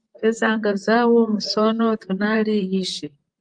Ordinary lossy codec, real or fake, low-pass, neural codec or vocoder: Opus, 24 kbps; fake; 9.9 kHz; vocoder, 44.1 kHz, 128 mel bands, Pupu-Vocoder